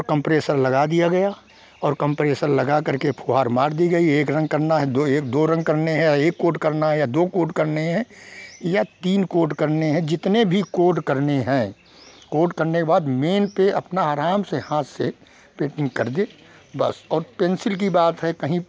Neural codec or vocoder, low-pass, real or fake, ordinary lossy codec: none; none; real; none